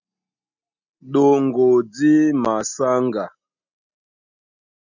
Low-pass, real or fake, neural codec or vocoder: 7.2 kHz; real; none